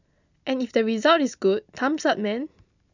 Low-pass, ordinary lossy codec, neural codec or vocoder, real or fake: 7.2 kHz; none; none; real